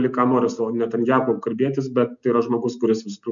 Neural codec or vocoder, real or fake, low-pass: none; real; 7.2 kHz